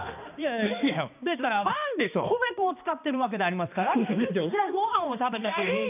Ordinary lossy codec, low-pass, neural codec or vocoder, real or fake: none; 3.6 kHz; codec, 16 kHz, 2 kbps, X-Codec, HuBERT features, trained on balanced general audio; fake